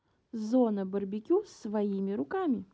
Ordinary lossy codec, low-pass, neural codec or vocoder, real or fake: none; none; none; real